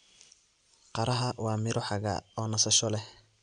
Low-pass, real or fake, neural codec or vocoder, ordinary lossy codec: 9.9 kHz; real; none; none